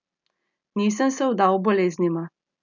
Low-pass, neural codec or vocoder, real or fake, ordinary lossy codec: 7.2 kHz; none; real; none